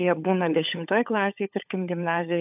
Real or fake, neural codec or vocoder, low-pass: fake; codec, 16 kHz, 16 kbps, FunCodec, trained on LibriTTS, 50 frames a second; 3.6 kHz